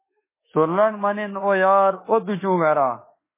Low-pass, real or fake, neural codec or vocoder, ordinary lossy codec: 3.6 kHz; fake; autoencoder, 48 kHz, 32 numbers a frame, DAC-VAE, trained on Japanese speech; MP3, 16 kbps